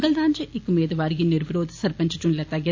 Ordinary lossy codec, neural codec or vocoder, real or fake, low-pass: AAC, 48 kbps; none; real; 7.2 kHz